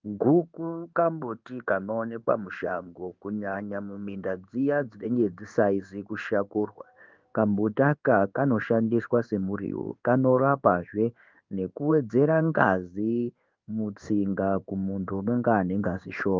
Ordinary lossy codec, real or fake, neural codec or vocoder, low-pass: Opus, 24 kbps; fake; codec, 16 kHz in and 24 kHz out, 1 kbps, XY-Tokenizer; 7.2 kHz